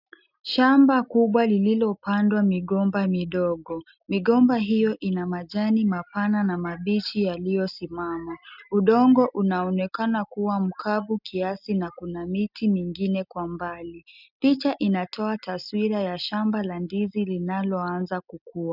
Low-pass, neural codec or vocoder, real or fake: 5.4 kHz; none; real